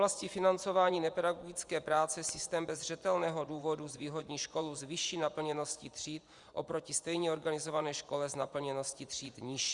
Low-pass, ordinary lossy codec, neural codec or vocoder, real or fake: 10.8 kHz; Opus, 64 kbps; vocoder, 24 kHz, 100 mel bands, Vocos; fake